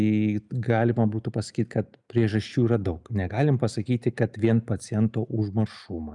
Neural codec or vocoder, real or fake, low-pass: none; real; 10.8 kHz